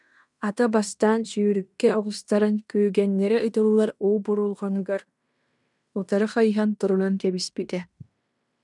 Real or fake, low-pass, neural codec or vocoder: fake; 10.8 kHz; codec, 16 kHz in and 24 kHz out, 0.9 kbps, LongCat-Audio-Codec, fine tuned four codebook decoder